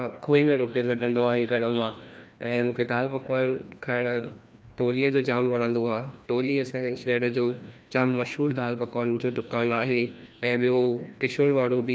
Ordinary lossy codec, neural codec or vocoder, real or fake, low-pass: none; codec, 16 kHz, 1 kbps, FreqCodec, larger model; fake; none